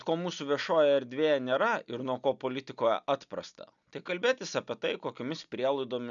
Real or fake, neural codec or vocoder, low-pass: real; none; 7.2 kHz